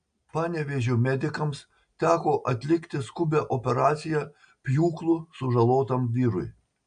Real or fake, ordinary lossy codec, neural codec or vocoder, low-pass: real; AAC, 96 kbps; none; 9.9 kHz